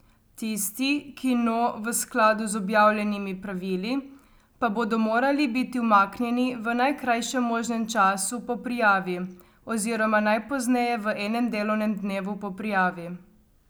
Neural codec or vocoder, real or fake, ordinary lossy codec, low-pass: none; real; none; none